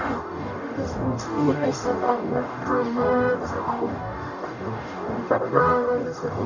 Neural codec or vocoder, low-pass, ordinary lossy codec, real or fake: codec, 44.1 kHz, 0.9 kbps, DAC; 7.2 kHz; none; fake